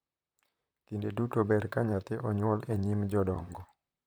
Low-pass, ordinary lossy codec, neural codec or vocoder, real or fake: none; none; vocoder, 44.1 kHz, 128 mel bands, Pupu-Vocoder; fake